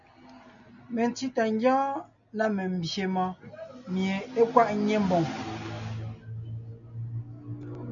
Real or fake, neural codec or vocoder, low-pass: real; none; 7.2 kHz